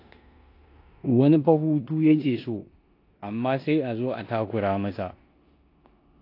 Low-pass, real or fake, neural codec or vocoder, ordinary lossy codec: 5.4 kHz; fake; codec, 16 kHz in and 24 kHz out, 0.9 kbps, LongCat-Audio-Codec, four codebook decoder; AAC, 32 kbps